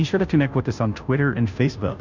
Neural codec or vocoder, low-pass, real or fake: codec, 16 kHz, 0.5 kbps, FunCodec, trained on Chinese and English, 25 frames a second; 7.2 kHz; fake